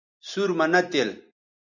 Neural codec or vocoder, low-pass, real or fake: none; 7.2 kHz; real